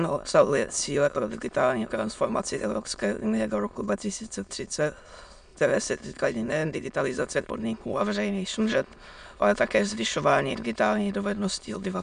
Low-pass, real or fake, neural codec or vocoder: 9.9 kHz; fake; autoencoder, 22.05 kHz, a latent of 192 numbers a frame, VITS, trained on many speakers